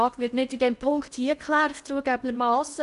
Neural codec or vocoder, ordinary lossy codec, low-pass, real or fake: codec, 16 kHz in and 24 kHz out, 0.8 kbps, FocalCodec, streaming, 65536 codes; none; 10.8 kHz; fake